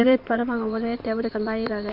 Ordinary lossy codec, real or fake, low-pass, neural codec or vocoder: Opus, 64 kbps; fake; 5.4 kHz; codec, 16 kHz in and 24 kHz out, 2.2 kbps, FireRedTTS-2 codec